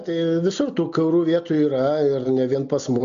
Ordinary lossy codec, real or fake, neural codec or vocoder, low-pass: MP3, 64 kbps; real; none; 7.2 kHz